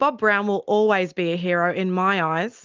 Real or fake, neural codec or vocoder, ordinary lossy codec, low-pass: real; none; Opus, 24 kbps; 7.2 kHz